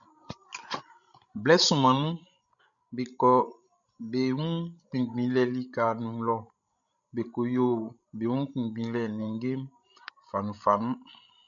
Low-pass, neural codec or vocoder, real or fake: 7.2 kHz; codec, 16 kHz, 16 kbps, FreqCodec, larger model; fake